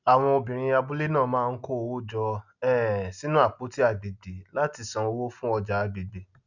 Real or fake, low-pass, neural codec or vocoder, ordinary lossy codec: real; 7.2 kHz; none; none